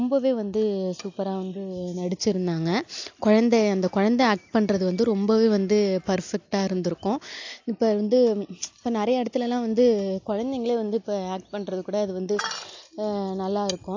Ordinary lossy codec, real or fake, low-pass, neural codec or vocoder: AAC, 48 kbps; real; 7.2 kHz; none